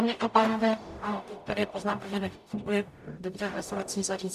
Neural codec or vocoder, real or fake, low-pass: codec, 44.1 kHz, 0.9 kbps, DAC; fake; 14.4 kHz